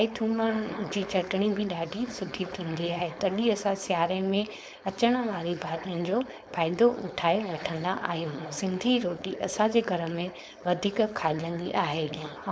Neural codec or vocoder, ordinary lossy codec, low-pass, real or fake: codec, 16 kHz, 4.8 kbps, FACodec; none; none; fake